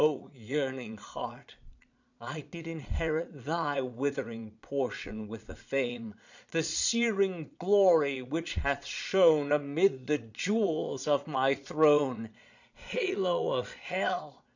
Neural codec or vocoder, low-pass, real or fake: vocoder, 22.05 kHz, 80 mel bands, Vocos; 7.2 kHz; fake